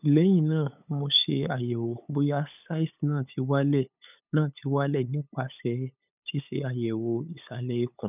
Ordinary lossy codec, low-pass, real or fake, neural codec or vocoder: none; 3.6 kHz; fake; codec, 16 kHz, 8 kbps, FunCodec, trained on LibriTTS, 25 frames a second